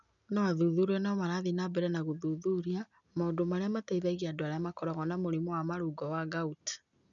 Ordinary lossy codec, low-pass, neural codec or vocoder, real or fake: none; 7.2 kHz; none; real